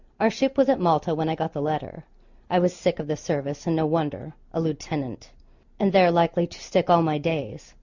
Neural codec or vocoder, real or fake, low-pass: none; real; 7.2 kHz